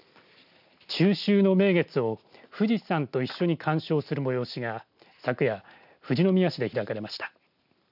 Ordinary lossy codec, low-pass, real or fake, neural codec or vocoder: none; 5.4 kHz; real; none